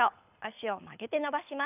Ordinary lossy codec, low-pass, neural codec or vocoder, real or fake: none; 3.6 kHz; codec, 24 kHz, 6 kbps, HILCodec; fake